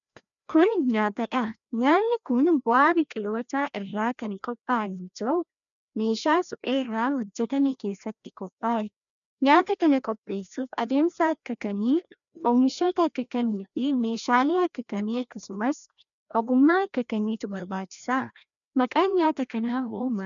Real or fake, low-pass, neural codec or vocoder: fake; 7.2 kHz; codec, 16 kHz, 1 kbps, FreqCodec, larger model